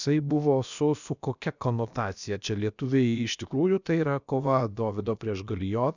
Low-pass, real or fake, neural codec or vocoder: 7.2 kHz; fake; codec, 16 kHz, about 1 kbps, DyCAST, with the encoder's durations